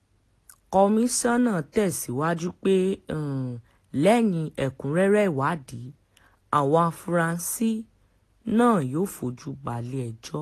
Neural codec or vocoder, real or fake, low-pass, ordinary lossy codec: none; real; 14.4 kHz; AAC, 48 kbps